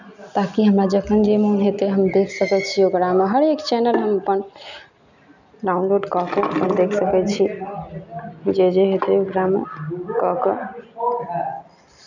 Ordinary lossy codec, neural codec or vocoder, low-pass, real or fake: none; none; 7.2 kHz; real